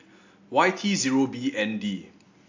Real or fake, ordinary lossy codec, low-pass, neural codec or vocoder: real; AAC, 48 kbps; 7.2 kHz; none